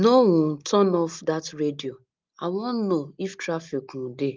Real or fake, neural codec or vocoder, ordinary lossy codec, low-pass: real; none; Opus, 32 kbps; 7.2 kHz